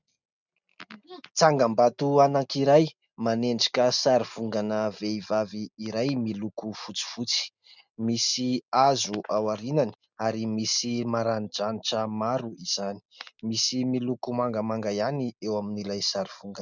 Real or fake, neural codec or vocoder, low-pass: real; none; 7.2 kHz